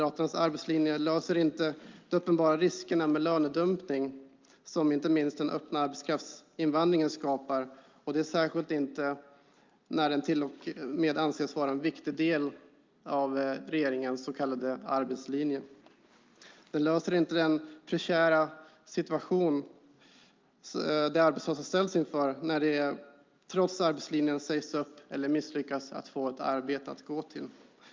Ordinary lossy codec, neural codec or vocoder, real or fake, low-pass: Opus, 24 kbps; none; real; 7.2 kHz